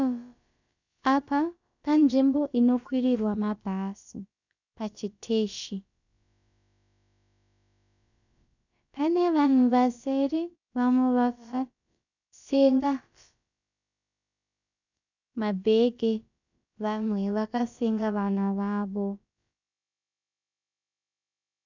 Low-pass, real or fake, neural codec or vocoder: 7.2 kHz; fake; codec, 16 kHz, about 1 kbps, DyCAST, with the encoder's durations